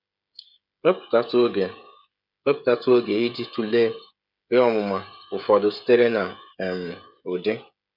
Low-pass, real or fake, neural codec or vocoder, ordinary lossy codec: 5.4 kHz; fake; codec, 16 kHz, 16 kbps, FreqCodec, smaller model; none